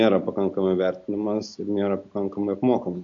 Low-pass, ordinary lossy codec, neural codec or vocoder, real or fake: 7.2 kHz; AAC, 64 kbps; none; real